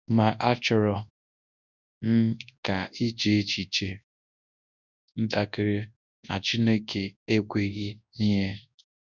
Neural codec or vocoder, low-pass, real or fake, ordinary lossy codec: codec, 24 kHz, 0.9 kbps, WavTokenizer, large speech release; 7.2 kHz; fake; none